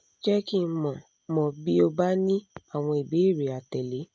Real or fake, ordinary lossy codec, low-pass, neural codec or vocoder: real; none; none; none